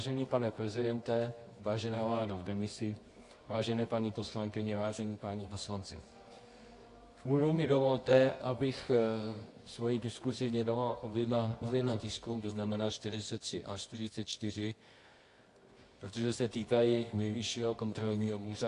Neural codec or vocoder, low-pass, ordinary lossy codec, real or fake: codec, 24 kHz, 0.9 kbps, WavTokenizer, medium music audio release; 10.8 kHz; AAC, 48 kbps; fake